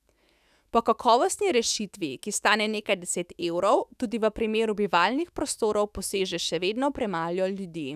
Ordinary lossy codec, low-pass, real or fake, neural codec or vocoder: none; 14.4 kHz; fake; autoencoder, 48 kHz, 128 numbers a frame, DAC-VAE, trained on Japanese speech